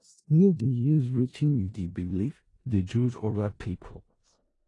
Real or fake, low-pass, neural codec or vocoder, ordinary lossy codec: fake; 10.8 kHz; codec, 16 kHz in and 24 kHz out, 0.4 kbps, LongCat-Audio-Codec, four codebook decoder; AAC, 32 kbps